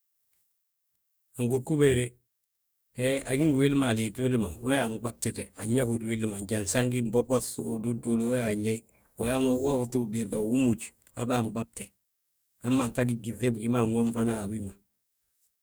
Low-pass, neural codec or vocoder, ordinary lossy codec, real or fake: none; codec, 44.1 kHz, 2.6 kbps, DAC; none; fake